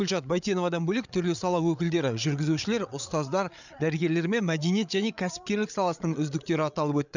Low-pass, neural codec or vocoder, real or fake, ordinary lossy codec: 7.2 kHz; codec, 16 kHz, 8 kbps, FreqCodec, larger model; fake; none